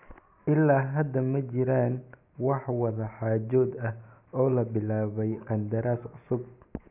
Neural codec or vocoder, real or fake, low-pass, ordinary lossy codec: none; real; 3.6 kHz; none